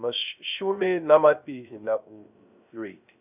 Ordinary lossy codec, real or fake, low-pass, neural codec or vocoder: none; fake; 3.6 kHz; codec, 16 kHz, 0.3 kbps, FocalCodec